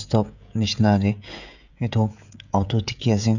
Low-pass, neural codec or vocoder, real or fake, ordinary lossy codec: 7.2 kHz; codec, 16 kHz, 16 kbps, FreqCodec, smaller model; fake; none